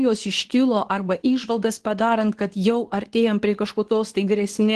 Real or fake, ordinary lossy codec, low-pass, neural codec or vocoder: fake; Opus, 16 kbps; 10.8 kHz; codec, 24 kHz, 0.9 kbps, WavTokenizer, medium speech release version 2